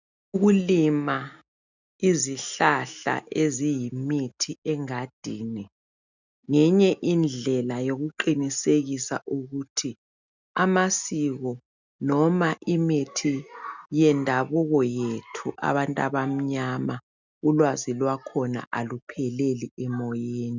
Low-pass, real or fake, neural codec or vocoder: 7.2 kHz; real; none